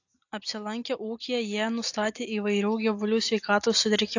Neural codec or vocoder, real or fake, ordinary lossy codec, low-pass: none; real; AAC, 48 kbps; 7.2 kHz